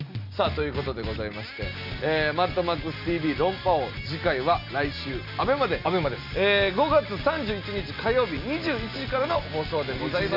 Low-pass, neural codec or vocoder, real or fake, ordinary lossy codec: 5.4 kHz; none; real; none